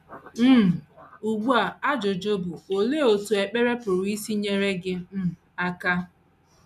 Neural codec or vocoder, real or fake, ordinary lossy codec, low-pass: none; real; none; 14.4 kHz